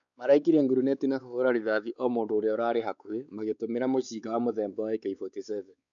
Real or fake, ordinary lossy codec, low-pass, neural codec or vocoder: fake; none; 7.2 kHz; codec, 16 kHz, 4 kbps, X-Codec, WavLM features, trained on Multilingual LibriSpeech